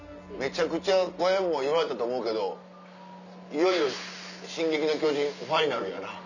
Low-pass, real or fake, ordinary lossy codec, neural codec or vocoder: 7.2 kHz; real; none; none